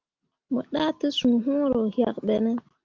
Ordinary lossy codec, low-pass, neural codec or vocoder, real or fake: Opus, 24 kbps; 7.2 kHz; none; real